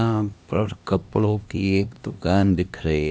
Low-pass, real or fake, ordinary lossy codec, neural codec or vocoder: none; fake; none; codec, 16 kHz, 1 kbps, X-Codec, HuBERT features, trained on LibriSpeech